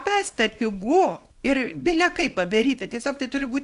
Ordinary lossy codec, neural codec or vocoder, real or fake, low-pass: AAC, 64 kbps; codec, 24 kHz, 0.9 kbps, WavTokenizer, small release; fake; 10.8 kHz